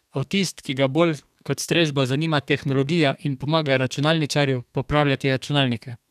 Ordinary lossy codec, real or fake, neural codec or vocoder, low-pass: none; fake; codec, 32 kHz, 1.9 kbps, SNAC; 14.4 kHz